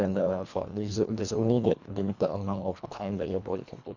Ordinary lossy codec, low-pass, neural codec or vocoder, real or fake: none; 7.2 kHz; codec, 24 kHz, 1.5 kbps, HILCodec; fake